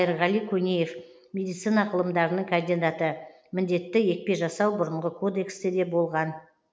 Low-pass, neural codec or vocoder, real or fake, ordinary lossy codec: none; none; real; none